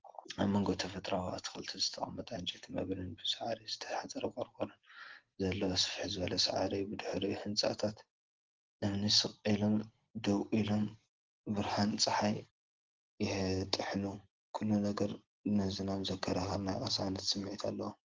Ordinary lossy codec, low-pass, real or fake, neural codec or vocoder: Opus, 16 kbps; 7.2 kHz; real; none